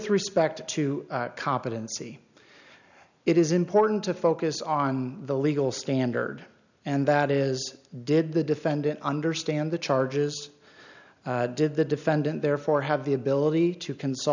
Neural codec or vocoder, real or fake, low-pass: none; real; 7.2 kHz